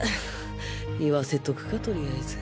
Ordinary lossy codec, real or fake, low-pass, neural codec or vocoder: none; real; none; none